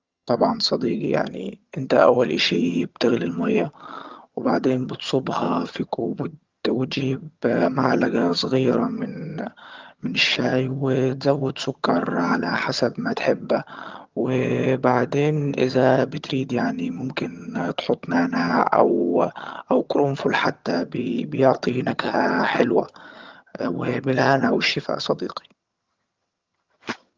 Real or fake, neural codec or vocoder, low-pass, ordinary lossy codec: fake; vocoder, 22.05 kHz, 80 mel bands, HiFi-GAN; 7.2 kHz; Opus, 32 kbps